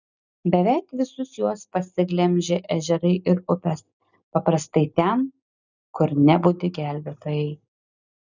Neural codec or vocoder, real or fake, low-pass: none; real; 7.2 kHz